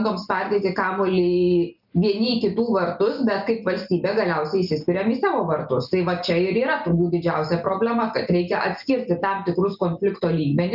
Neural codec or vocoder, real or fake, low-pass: none; real; 5.4 kHz